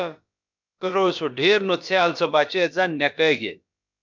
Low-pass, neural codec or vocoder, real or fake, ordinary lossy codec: 7.2 kHz; codec, 16 kHz, about 1 kbps, DyCAST, with the encoder's durations; fake; MP3, 64 kbps